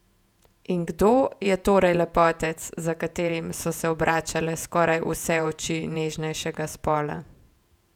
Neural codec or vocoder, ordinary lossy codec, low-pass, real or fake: vocoder, 48 kHz, 128 mel bands, Vocos; none; 19.8 kHz; fake